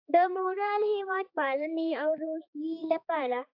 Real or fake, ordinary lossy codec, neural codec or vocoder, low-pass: fake; AAC, 48 kbps; codec, 16 kHz, 4 kbps, X-Codec, HuBERT features, trained on balanced general audio; 5.4 kHz